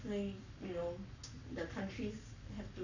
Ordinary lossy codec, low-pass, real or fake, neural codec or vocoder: none; 7.2 kHz; fake; codec, 44.1 kHz, 7.8 kbps, Pupu-Codec